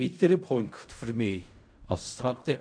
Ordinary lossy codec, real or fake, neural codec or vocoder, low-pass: AAC, 64 kbps; fake; codec, 16 kHz in and 24 kHz out, 0.4 kbps, LongCat-Audio-Codec, fine tuned four codebook decoder; 9.9 kHz